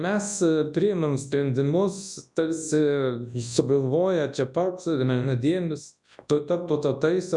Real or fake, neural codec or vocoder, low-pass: fake; codec, 24 kHz, 0.9 kbps, WavTokenizer, large speech release; 10.8 kHz